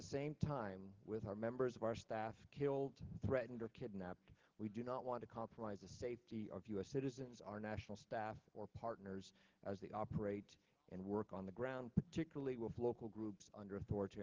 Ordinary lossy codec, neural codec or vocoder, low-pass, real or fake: Opus, 16 kbps; none; 7.2 kHz; real